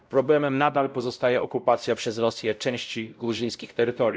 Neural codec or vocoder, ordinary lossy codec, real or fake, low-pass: codec, 16 kHz, 0.5 kbps, X-Codec, WavLM features, trained on Multilingual LibriSpeech; none; fake; none